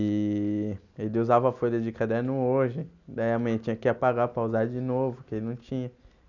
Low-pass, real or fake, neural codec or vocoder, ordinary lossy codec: 7.2 kHz; real; none; none